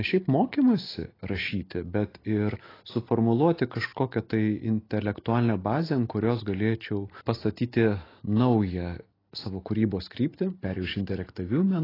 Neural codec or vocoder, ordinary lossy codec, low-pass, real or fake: none; AAC, 24 kbps; 5.4 kHz; real